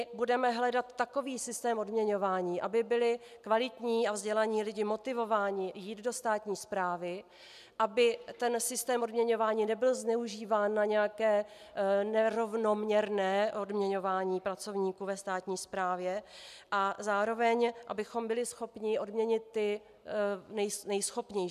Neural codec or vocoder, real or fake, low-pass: none; real; 14.4 kHz